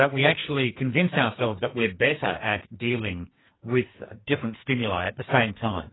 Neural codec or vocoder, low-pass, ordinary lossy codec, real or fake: codec, 44.1 kHz, 2.6 kbps, SNAC; 7.2 kHz; AAC, 16 kbps; fake